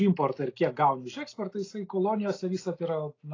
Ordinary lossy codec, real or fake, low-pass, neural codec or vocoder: AAC, 32 kbps; real; 7.2 kHz; none